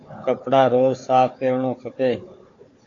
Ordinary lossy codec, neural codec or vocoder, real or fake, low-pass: AAC, 48 kbps; codec, 16 kHz, 4 kbps, FunCodec, trained on Chinese and English, 50 frames a second; fake; 7.2 kHz